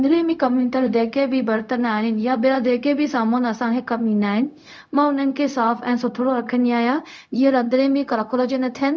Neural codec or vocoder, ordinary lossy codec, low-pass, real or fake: codec, 16 kHz, 0.4 kbps, LongCat-Audio-Codec; none; none; fake